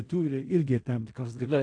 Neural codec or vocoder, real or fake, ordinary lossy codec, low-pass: codec, 16 kHz in and 24 kHz out, 0.4 kbps, LongCat-Audio-Codec, fine tuned four codebook decoder; fake; AAC, 48 kbps; 9.9 kHz